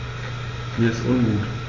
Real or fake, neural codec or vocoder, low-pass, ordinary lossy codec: real; none; 7.2 kHz; AAC, 32 kbps